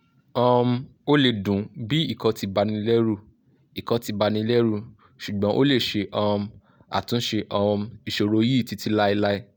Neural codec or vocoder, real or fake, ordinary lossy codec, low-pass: none; real; none; none